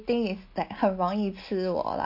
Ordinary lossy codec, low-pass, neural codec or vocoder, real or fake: MP3, 32 kbps; 5.4 kHz; none; real